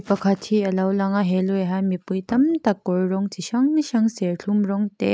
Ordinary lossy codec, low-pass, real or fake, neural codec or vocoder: none; none; real; none